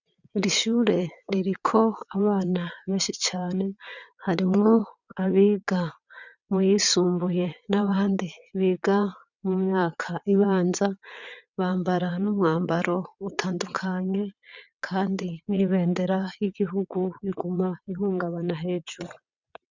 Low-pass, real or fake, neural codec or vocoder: 7.2 kHz; fake; vocoder, 22.05 kHz, 80 mel bands, WaveNeXt